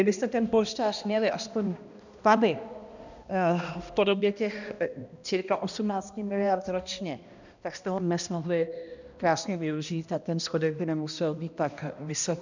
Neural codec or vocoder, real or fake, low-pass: codec, 16 kHz, 1 kbps, X-Codec, HuBERT features, trained on balanced general audio; fake; 7.2 kHz